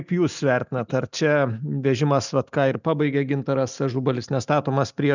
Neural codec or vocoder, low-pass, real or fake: none; 7.2 kHz; real